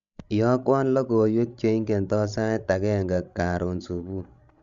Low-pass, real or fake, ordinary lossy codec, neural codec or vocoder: 7.2 kHz; fake; MP3, 64 kbps; codec, 16 kHz, 16 kbps, FreqCodec, larger model